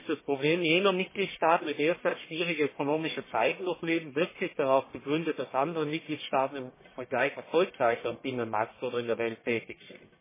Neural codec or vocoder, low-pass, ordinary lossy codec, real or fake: codec, 44.1 kHz, 1.7 kbps, Pupu-Codec; 3.6 kHz; MP3, 16 kbps; fake